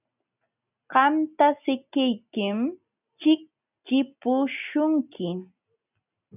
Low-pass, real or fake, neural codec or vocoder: 3.6 kHz; real; none